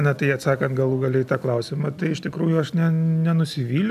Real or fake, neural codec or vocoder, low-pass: real; none; 14.4 kHz